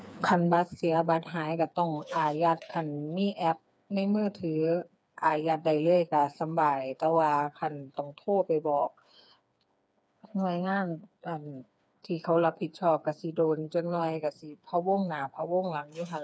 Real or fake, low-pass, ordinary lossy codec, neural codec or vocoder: fake; none; none; codec, 16 kHz, 4 kbps, FreqCodec, smaller model